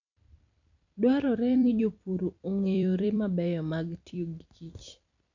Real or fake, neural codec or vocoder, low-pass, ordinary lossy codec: fake; vocoder, 44.1 kHz, 128 mel bands every 256 samples, BigVGAN v2; 7.2 kHz; none